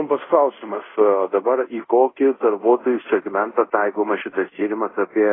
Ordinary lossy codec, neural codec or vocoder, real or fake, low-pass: AAC, 16 kbps; codec, 24 kHz, 0.5 kbps, DualCodec; fake; 7.2 kHz